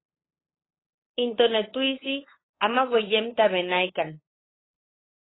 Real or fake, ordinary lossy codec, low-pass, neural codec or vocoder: fake; AAC, 16 kbps; 7.2 kHz; codec, 16 kHz, 8 kbps, FunCodec, trained on LibriTTS, 25 frames a second